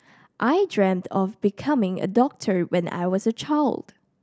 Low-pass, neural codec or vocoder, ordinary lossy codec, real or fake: none; none; none; real